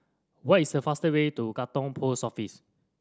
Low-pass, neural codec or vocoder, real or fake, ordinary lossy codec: none; none; real; none